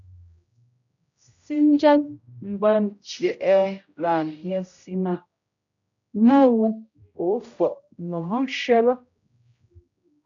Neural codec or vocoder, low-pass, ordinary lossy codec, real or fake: codec, 16 kHz, 0.5 kbps, X-Codec, HuBERT features, trained on general audio; 7.2 kHz; MP3, 64 kbps; fake